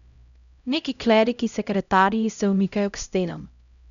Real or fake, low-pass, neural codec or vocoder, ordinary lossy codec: fake; 7.2 kHz; codec, 16 kHz, 0.5 kbps, X-Codec, HuBERT features, trained on LibriSpeech; none